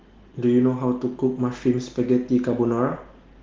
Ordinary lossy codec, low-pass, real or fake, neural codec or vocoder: Opus, 16 kbps; 7.2 kHz; real; none